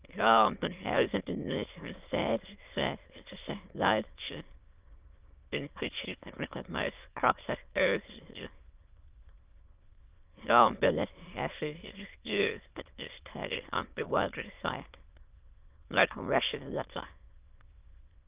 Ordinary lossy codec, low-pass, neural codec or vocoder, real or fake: Opus, 32 kbps; 3.6 kHz; autoencoder, 22.05 kHz, a latent of 192 numbers a frame, VITS, trained on many speakers; fake